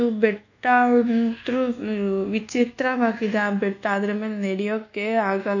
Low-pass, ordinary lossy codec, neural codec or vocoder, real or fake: 7.2 kHz; none; codec, 24 kHz, 1.2 kbps, DualCodec; fake